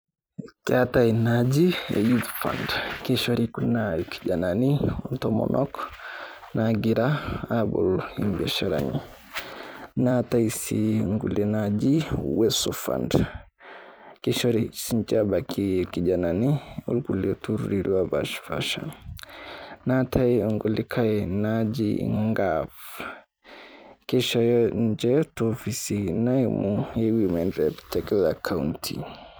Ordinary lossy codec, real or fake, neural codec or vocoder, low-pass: none; fake; vocoder, 44.1 kHz, 128 mel bands every 256 samples, BigVGAN v2; none